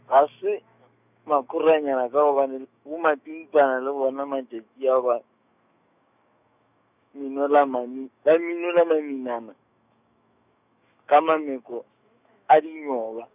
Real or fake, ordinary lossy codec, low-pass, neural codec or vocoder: fake; none; 3.6 kHz; autoencoder, 48 kHz, 128 numbers a frame, DAC-VAE, trained on Japanese speech